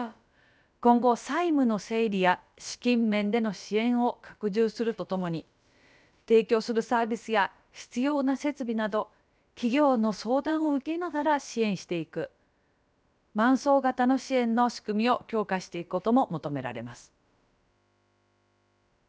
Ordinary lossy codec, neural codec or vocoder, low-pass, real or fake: none; codec, 16 kHz, about 1 kbps, DyCAST, with the encoder's durations; none; fake